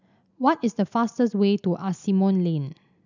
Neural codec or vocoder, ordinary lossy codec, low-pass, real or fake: none; none; 7.2 kHz; real